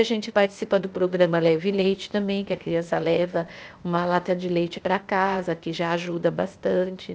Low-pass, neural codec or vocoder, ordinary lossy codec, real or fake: none; codec, 16 kHz, 0.8 kbps, ZipCodec; none; fake